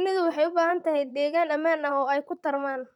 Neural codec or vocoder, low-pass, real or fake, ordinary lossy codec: vocoder, 44.1 kHz, 128 mel bands, Pupu-Vocoder; 19.8 kHz; fake; none